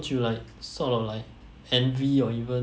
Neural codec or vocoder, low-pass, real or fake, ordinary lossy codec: none; none; real; none